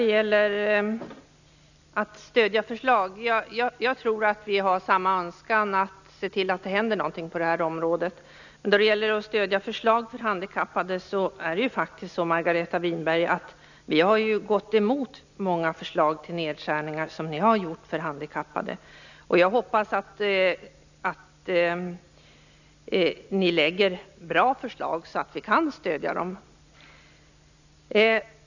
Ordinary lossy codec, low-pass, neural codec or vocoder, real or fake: none; 7.2 kHz; none; real